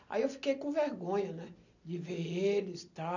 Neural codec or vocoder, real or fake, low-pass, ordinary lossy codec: none; real; 7.2 kHz; none